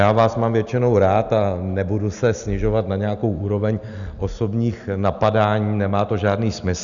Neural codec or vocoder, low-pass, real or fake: none; 7.2 kHz; real